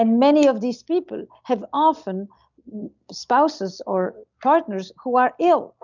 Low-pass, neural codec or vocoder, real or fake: 7.2 kHz; none; real